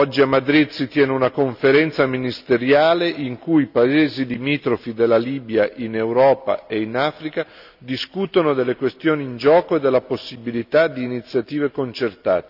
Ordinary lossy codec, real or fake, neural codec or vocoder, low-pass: none; real; none; 5.4 kHz